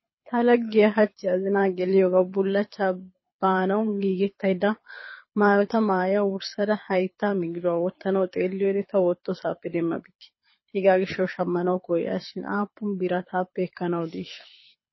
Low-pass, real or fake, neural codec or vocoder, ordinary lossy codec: 7.2 kHz; fake; codec, 24 kHz, 6 kbps, HILCodec; MP3, 24 kbps